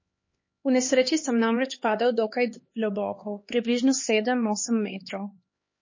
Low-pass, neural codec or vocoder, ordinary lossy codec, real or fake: 7.2 kHz; codec, 16 kHz, 2 kbps, X-Codec, HuBERT features, trained on LibriSpeech; MP3, 32 kbps; fake